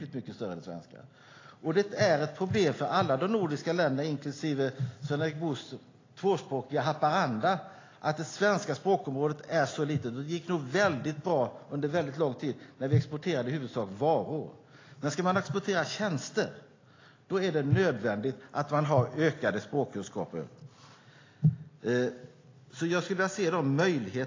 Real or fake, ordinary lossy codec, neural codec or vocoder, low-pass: real; AAC, 32 kbps; none; 7.2 kHz